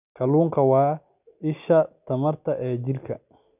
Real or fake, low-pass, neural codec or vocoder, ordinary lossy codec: real; 3.6 kHz; none; none